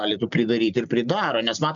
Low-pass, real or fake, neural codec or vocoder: 7.2 kHz; real; none